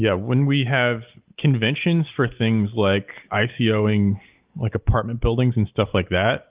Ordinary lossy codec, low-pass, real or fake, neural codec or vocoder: Opus, 32 kbps; 3.6 kHz; real; none